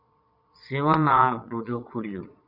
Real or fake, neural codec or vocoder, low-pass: fake; vocoder, 22.05 kHz, 80 mel bands, Vocos; 5.4 kHz